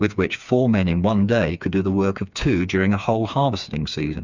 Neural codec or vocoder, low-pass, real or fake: codec, 16 kHz, 4 kbps, FreqCodec, smaller model; 7.2 kHz; fake